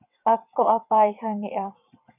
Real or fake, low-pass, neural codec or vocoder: fake; 3.6 kHz; vocoder, 22.05 kHz, 80 mel bands, WaveNeXt